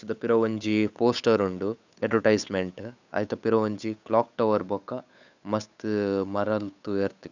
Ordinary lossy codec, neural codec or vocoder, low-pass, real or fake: Opus, 64 kbps; codec, 16 kHz, 8 kbps, FunCodec, trained on Chinese and English, 25 frames a second; 7.2 kHz; fake